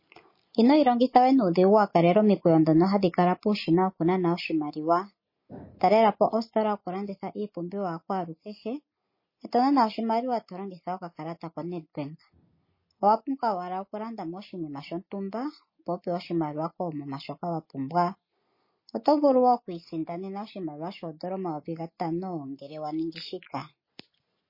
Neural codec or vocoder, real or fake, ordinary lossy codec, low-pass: none; real; MP3, 24 kbps; 5.4 kHz